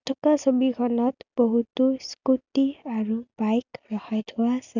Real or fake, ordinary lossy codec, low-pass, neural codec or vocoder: real; none; 7.2 kHz; none